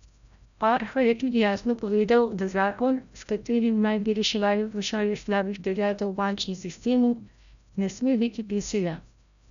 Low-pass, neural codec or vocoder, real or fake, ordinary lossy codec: 7.2 kHz; codec, 16 kHz, 0.5 kbps, FreqCodec, larger model; fake; none